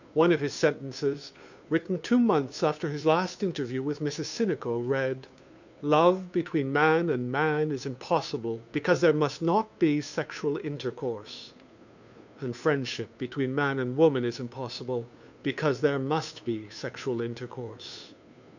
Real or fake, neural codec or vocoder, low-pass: fake; codec, 16 kHz, 2 kbps, FunCodec, trained on Chinese and English, 25 frames a second; 7.2 kHz